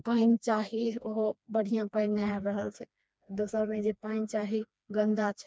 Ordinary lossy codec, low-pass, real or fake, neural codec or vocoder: none; none; fake; codec, 16 kHz, 2 kbps, FreqCodec, smaller model